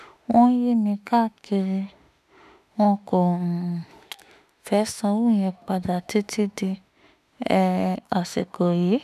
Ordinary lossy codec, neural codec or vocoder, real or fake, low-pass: none; autoencoder, 48 kHz, 32 numbers a frame, DAC-VAE, trained on Japanese speech; fake; 14.4 kHz